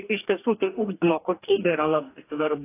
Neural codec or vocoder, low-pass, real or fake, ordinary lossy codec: codec, 44.1 kHz, 2.6 kbps, DAC; 3.6 kHz; fake; AAC, 24 kbps